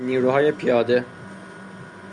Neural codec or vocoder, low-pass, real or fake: none; 10.8 kHz; real